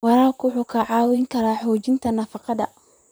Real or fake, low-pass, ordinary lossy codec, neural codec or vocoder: fake; none; none; vocoder, 44.1 kHz, 128 mel bands, Pupu-Vocoder